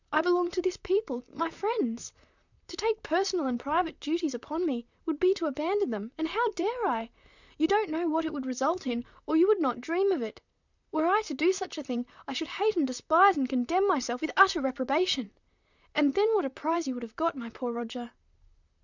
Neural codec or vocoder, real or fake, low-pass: vocoder, 44.1 kHz, 128 mel bands, Pupu-Vocoder; fake; 7.2 kHz